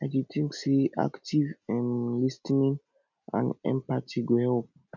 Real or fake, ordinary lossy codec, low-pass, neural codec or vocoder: real; none; 7.2 kHz; none